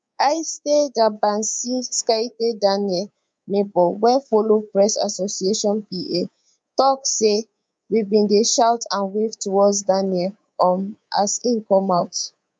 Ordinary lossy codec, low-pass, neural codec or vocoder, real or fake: none; 9.9 kHz; codec, 24 kHz, 3.1 kbps, DualCodec; fake